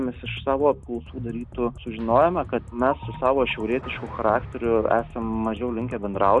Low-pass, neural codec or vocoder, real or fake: 10.8 kHz; none; real